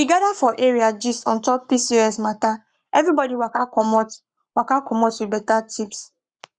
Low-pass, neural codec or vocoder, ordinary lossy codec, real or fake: 9.9 kHz; codec, 44.1 kHz, 7.8 kbps, Pupu-Codec; Opus, 64 kbps; fake